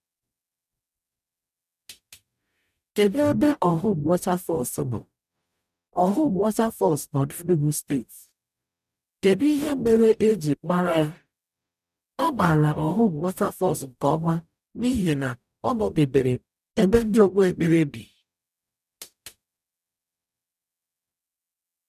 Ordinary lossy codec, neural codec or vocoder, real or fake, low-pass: none; codec, 44.1 kHz, 0.9 kbps, DAC; fake; 14.4 kHz